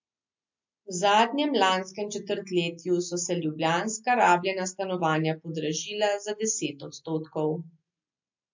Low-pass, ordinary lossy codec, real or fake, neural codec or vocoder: 7.2 kHz; MP3, 48 kbps; real; none